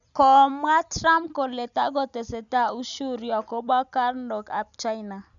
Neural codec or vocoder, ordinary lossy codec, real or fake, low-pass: none; none; real; 7.2 kHz